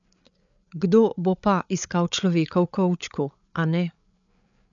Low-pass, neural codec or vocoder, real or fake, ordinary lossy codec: 7.2 kHz; codec, 16 kHz, 8 kbps, FreqCodec, larger model; fake; MP3, 96 kbps